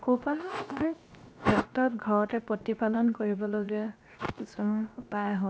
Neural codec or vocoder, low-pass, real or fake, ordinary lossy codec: codec, 16 kHz, 0.7 kbps, FocalCodec; none; fake; none